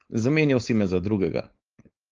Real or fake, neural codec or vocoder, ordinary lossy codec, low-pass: fake; codec, 16 kHz, 4.8 kbps, FACodec; Opus, 24 kbps; 7.2 kHz